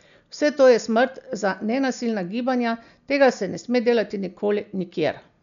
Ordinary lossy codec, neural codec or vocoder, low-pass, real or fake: none; none; 7.2 kHz; real